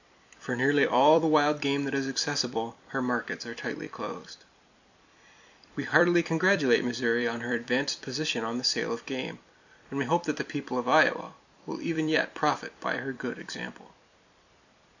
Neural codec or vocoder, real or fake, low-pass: vocoder, 44.1 kHz, 128 mel bands every 256 samples, BigVGAN v2; fake; 7.2 kHz